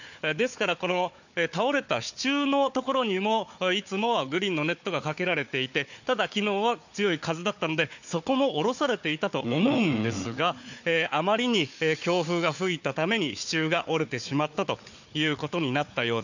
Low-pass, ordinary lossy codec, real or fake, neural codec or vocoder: 7.2 kHz; none; fake; codec, 16 kHz, 4 kbps, FunCodec, trained on Chinese and English, 50 frames a second